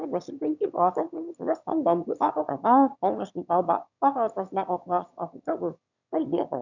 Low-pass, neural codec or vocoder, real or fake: 7.2 kHz; autoencoder, 22.05 kHz, a latent of 192 numbers a frame, VITS, trained on one speaker; fake